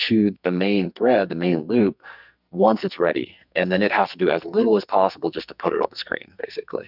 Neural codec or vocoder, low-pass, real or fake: codec, 44.1 kHz, 2.6 kbps, SNAC; 5.4 kHz; fake